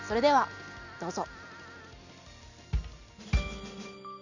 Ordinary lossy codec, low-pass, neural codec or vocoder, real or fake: none; 7.2 kHz; none; real